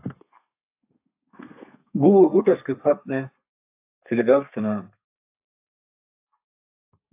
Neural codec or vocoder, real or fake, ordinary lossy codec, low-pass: codec, 32 kHz, 1.9 kbps, SNAC; fake; AAC, 32 kbps; 3.6 kHz